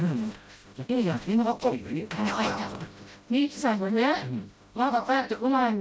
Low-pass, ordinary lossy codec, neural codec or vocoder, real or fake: none; none; codec, 16 kHz, 0.5 kbps, FreqCodec, smaller model; fake